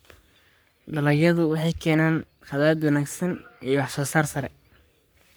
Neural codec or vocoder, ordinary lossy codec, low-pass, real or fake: codec, 44.1 kHz, 3.4 kbps, Pupu-Codec; none; none; fake